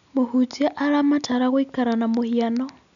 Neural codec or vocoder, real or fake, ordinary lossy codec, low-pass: none; real; none; 7.2 kHz